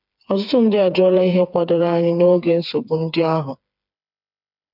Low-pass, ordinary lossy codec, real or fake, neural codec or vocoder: 5.4 kHz; none; fake; codec, 16 kHz, 4 kbps, FreqCodec, smaller model